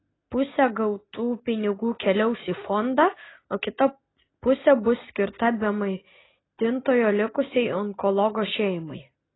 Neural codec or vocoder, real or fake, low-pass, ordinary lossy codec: none; real; 7.2 kHz; AAC, 16 kbps